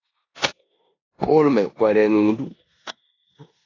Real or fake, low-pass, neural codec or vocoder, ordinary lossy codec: fake; 7.2 kHz; codec, 16 kHz in and 24 kHz out, 0.9 kbps, LongCat-Audio-Codec, four codebook decoder; AAC, 32 kbps